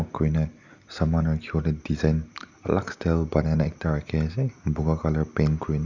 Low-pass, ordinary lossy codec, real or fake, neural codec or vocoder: 7.2 kHz; Opus, 64 kbps; real; none